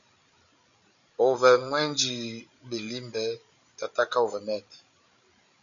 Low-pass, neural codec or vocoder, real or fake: 7.2 kHz; codec, 16 kHz, 8 kbps, FreqCodec, larger model; fake